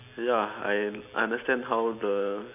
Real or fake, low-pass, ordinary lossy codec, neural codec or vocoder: real; 3.6 kHz; AAC, 32 kbps; none